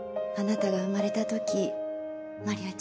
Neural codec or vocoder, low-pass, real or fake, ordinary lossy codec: none; none; real; none